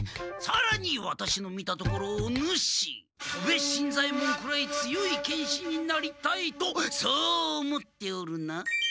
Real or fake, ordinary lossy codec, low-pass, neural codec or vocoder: real; none; none; none